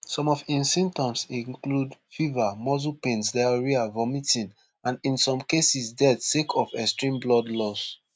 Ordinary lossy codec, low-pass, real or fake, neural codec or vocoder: none; none; real; none